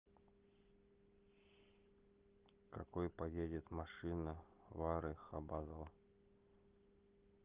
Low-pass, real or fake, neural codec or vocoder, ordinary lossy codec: 3.6 kHz; real; none; Opus, 24 kbps